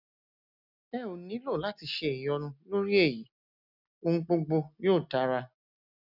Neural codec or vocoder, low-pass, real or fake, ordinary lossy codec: none; 5.4 kHz; real; none